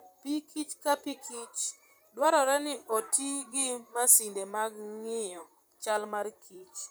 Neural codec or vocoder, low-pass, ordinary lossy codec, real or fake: vocoder, 44.1 kHz, 128 mel bands, Pupu-Vocoder; none; none; fake